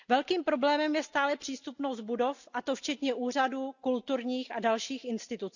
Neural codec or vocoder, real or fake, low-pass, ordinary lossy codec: none; real; 7.2 kHz; none